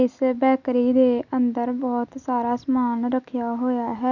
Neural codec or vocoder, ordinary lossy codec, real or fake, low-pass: none; none; real; 7.2 kHz